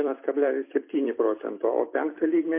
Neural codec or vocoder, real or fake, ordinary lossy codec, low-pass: vocoder, 44.1 kHz, 128 mel bands every 256 samples, BigVGAN v2; fake; MP3, 24 kbps; 3.6 kHz